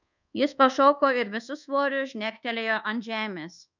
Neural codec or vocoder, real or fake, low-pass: codec, 24 kHz, 1.2 kbps, DualCodec; fake; 7.2 kHz